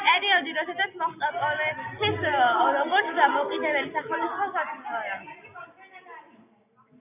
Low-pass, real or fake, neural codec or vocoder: 3.6 kHz; real; none